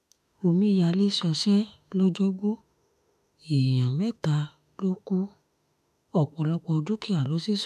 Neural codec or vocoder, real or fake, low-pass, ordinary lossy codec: autoencoder, 48 kHz, 32 numbers a frame, DAC-VAE, trained on Japanese speech; fake; 14.4 kHz; none